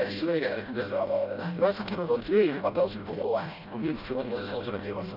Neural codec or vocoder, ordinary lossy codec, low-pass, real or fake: codec, 16 kHz, 0.5 kbps, FreqCodec, smaller model; none; 5.4 kHz; fake